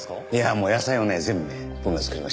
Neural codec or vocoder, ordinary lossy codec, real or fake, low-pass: none; none; real; none